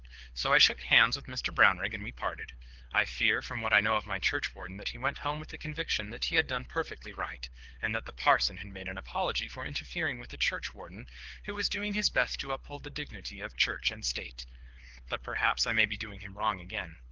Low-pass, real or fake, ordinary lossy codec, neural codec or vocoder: 7.2 kHz; fake; Opus, 16 kbps; codec, 24 kHz, 6 kbps, HILCodec